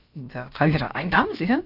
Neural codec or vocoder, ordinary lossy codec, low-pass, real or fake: codec, 16 kHz, about 1 kbps, DyCAST, with the encoder's durations; none; 5.4 kHz; fake